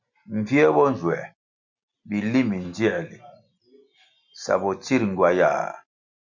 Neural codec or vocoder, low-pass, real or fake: none; 7.2 kHz; real